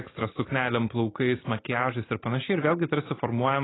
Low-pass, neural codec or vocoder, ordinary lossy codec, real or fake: 7.2 kHz; none; AAC, 16 kbps; real